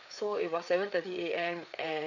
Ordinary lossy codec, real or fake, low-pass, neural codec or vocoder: none; fake; 7.2 kHz; codec, 16 kHz, 4 kbps, FreqCodec, larger model